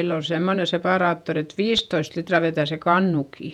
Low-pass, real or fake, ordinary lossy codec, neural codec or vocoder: 19.8 kHz; fake; none; vocoder, 48 kHz, 128 mel bands, Vocos